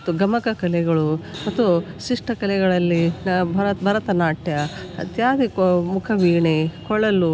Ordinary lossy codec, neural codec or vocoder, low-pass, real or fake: none; none; none; real